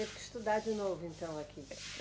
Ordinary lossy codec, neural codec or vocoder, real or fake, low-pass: none; none; real; none